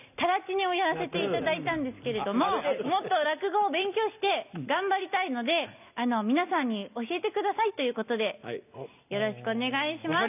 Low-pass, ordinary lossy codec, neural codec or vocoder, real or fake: 3.6 kHz; none; none; real